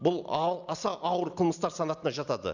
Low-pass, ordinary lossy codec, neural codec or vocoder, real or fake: 7.2 kHz; none; none; real